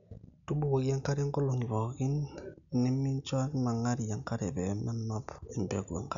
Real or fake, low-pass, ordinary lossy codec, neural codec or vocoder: real; 7.2 kHz; none; none